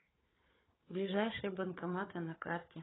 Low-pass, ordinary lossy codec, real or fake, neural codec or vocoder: 7.2 kHz; AAC, 16 kbps; fake; codec, 16 kHz, 4 kbps, FunCodec, trained on Chinese and English, 50 frames a second